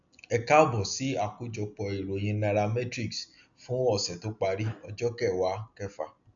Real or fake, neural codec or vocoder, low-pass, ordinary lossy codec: real; none; 7.2 kHz; none